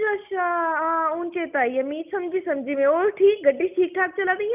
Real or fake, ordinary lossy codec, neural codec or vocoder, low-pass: real; none; none; 3.6 kHz